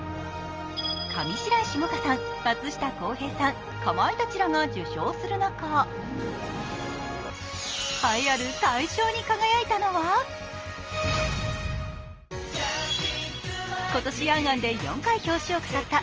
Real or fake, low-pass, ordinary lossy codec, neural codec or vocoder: real; 7.2 kHz; Opus, 24 kbps; none